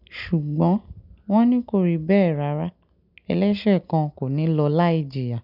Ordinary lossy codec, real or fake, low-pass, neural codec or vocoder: none; real; 5.4 kHz; none